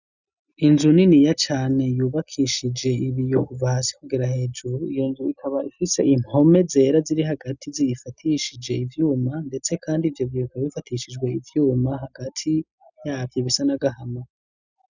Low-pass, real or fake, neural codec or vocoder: 7.2 kHz; real; none